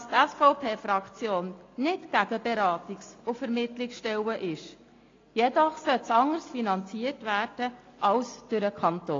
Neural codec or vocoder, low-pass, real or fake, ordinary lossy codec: none; 7.2 kHz; real; AAC, 32 kbps